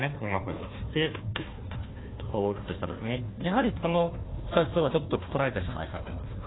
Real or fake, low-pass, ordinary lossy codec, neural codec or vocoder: fake; 7.2 kHz; AAC, 16 kbps; codec, 16 kHz, 1 kbps, FunCodec, trained on Chinese and English, 50 frames a second